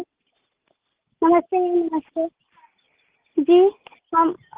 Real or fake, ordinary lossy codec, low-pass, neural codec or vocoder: real; Opus, 16 kbps; 3.6 kHz; none